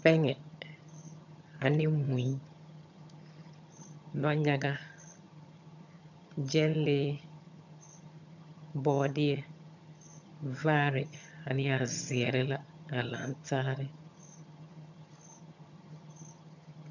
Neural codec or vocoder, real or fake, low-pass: vocoder, 22.05 kHz, 80 mel bands, HiFi-GAN; fake; 7.2 kHz